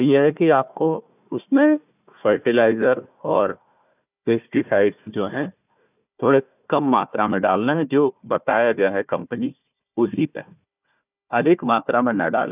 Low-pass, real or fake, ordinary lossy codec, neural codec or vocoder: 3.6 kHz; fake; none; codec, 16 kHz, 1 kbps, FunCodec, trained on Chinese and English, 50 frames a second